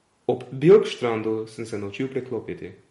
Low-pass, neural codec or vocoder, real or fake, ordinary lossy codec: 19.8 kHz; none; real; MP3, 48 kbps